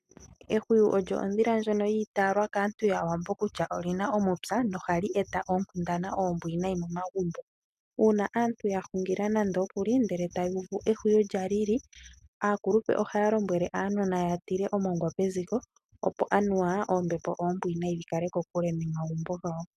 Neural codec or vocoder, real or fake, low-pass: none; real; 14.4 kHz